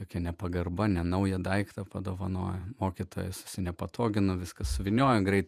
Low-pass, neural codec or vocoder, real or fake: 14.4 kHz; vocoder, 48 kHz, 128 mel bands, Vocos; fake